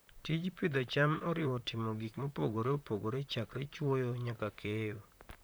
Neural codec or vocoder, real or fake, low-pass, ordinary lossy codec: vocoder, 44.1 kHz, 128 mel bands, Pupu-Vocoder; fake; none; none